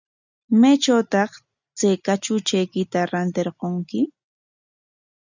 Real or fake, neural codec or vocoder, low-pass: real; none; 7.2 kHz